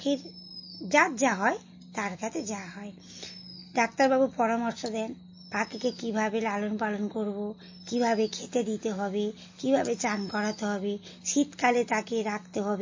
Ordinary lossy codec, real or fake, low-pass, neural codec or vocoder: MP3, 32 kbps; real; 7.2 kHz; none